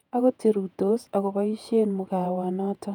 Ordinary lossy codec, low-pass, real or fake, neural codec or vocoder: none; 19.8 kHz; fake; vocoder, 48 kHz, 128 mel bands, Vocos